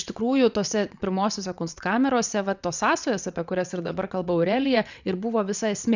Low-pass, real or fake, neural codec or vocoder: 7.2 kHz; fake; vocoder, 22.05 kHz, 80 mel bands, WaveNeXt